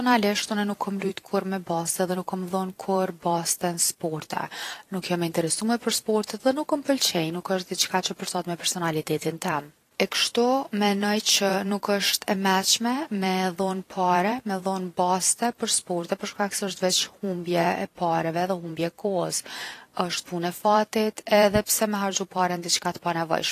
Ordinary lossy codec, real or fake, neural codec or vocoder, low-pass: AAC, 48 kbps; fake; vocoder, 44.1 kHz, 128 mel bands every 512 samples, BigVGAN v2; 14.4 kHz